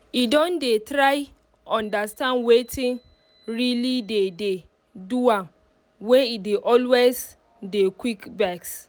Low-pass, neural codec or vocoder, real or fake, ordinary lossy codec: none; none; real; none